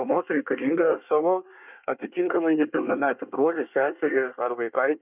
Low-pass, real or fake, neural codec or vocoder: 3.6 kHz; fake; codec, 24 kHz, 1 kbps, SNAC